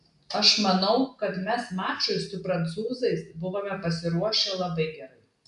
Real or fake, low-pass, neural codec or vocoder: real; 10.8 kHz; none